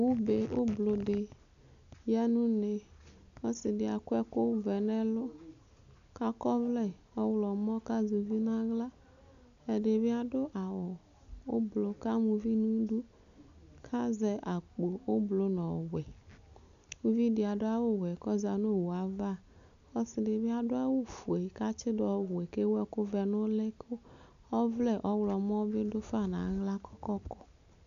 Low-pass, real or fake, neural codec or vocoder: 7.2 kHz; real; none